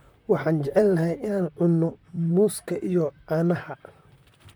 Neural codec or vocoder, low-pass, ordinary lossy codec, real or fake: vocoder, 44.1 kHz, 128 mel bands, Pupu-Vocoder; none; none; fake